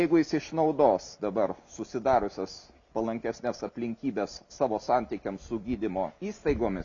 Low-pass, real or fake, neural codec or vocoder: 7.2 kHz; real; none